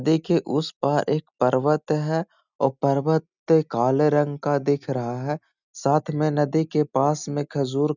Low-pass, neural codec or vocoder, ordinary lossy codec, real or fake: 7.2 kHz; none; none; real